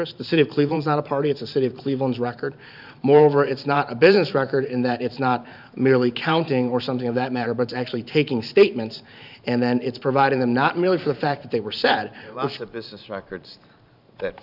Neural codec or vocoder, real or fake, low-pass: vocoder, 44.1 kHz, 128 mel bands every 512 samples, BigVGAN v2; fake; 5.4 kHz